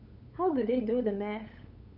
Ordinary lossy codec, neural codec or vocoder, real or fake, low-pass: none; codec, 16 kHz, 8 kbps, FunCodec, trained on LibriTTS, 25 frames a second; fake; 5.4 kHz